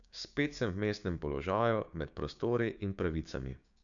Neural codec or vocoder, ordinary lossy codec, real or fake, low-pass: codec, 16 kHz, 6 kbps, DAC; none; fake; 7.2 kHz